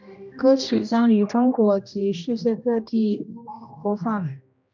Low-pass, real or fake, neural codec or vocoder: 7.2 kHz; fake; codec, 16 kHz, 1 kbps, X-Codec, HuBERT features, trained on general audio